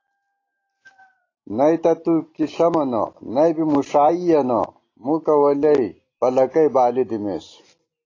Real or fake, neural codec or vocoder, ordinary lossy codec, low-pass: real; none; AAC, 32 kbps; 7.2 kHz